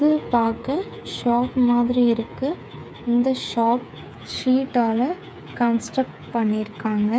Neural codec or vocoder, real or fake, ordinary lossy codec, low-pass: codec, 16 kHz, 8 kbps, FreqCodec, smaller model; fake; none; none